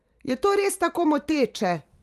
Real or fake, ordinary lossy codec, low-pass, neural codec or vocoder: fake; Opus, 32 kbps; 14.4 kHz; vocoder, 44.1 kHz, 128 mel bands every 512 samples, BigVGAN v2